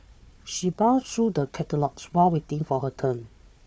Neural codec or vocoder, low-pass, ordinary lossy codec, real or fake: codec, 16 kHz, 4 kbps, FunCodec, trained on Chinese and English, 50 frames a second; none; none; fake